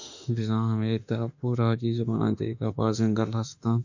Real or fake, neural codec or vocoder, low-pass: fake; autoencoder, 48 kHz, 32 numbers a frame, DAC-VAE, trained on Japanese speech; 7.2 kHz